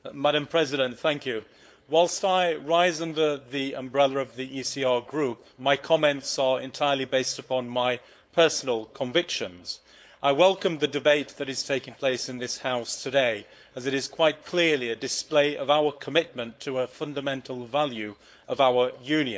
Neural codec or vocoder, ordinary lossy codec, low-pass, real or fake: codec, 16 kHz, 4.8 kbps, FACodec; none; none; fake